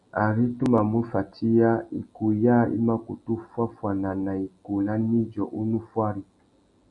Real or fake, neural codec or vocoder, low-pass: real; none; 10.8 kHz